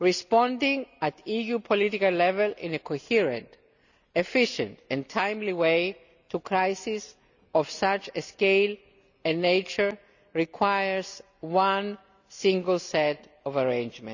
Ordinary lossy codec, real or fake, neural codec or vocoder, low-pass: none; real; none; 7.2 kHz